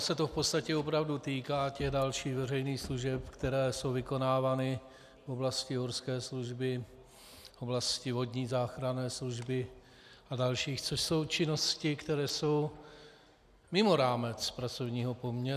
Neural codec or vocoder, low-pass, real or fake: none; 14.4 kHz; real